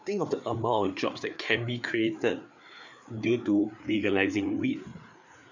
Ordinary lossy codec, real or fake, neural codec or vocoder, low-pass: none; fake; codec, 16 kHz, 4 kbps, FreqCodec, larger model; 7.2 kHz